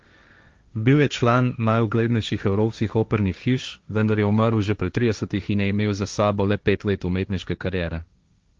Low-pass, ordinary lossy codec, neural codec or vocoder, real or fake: 7.2 kHz; Opus, 32 kbps; codec, 16 kHz, 1.1 kbps, Voila-Tokenizer; fake